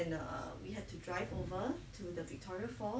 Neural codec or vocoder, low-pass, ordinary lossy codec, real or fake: none; none; none; real